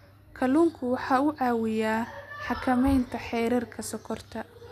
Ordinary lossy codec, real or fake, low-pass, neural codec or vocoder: none; real; 14.4 kHz; none